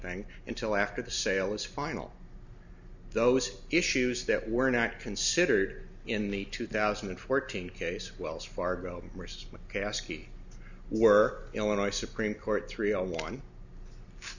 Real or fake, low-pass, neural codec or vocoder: real; 7.2 kHz; none